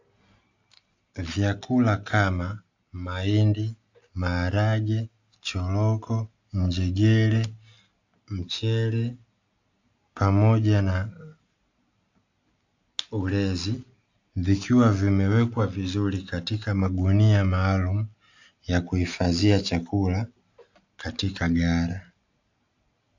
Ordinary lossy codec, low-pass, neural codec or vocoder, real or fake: AAC, 48 kbps; 7.2 kHz; none; real